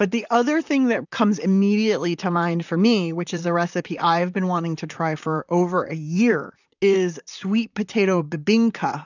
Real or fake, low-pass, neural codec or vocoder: fake; 7.2 kHz; vocoder, 44.1 kHz, 128 mel bands, Pupu-Vocoder